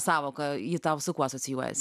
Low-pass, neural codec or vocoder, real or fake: 14.4 kHz; none; real